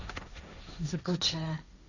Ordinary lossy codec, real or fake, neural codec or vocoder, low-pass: none; fake; codec, 16 kHz, 1.1 kbps, Voila-Tokenizer; 7.2 kHz